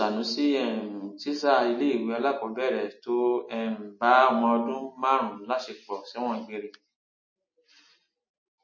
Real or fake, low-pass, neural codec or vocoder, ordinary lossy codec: real; 7.2 kHz; none; MP3, 32 kbps